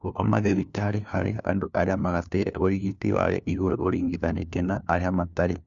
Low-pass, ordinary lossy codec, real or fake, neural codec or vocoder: 7.2 kHz; none; fake; codec, 16 kHz, 1 kbps, FunCodec, trained on LibriTTS, 50 frames a second